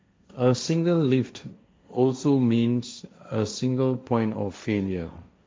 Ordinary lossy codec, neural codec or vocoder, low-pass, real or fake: none; codec, 16 kHz, 1.1 kbps, Voila-Tokenizer; none; fake